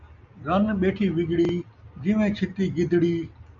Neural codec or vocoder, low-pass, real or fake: none; 7.2 kHz; real